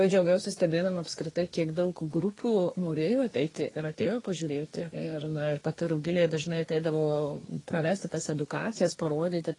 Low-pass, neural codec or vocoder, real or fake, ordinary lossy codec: 10.8 kHz; codec, 24 kHz, 1 kbps, SNAC; fake; AAC, 32 kbps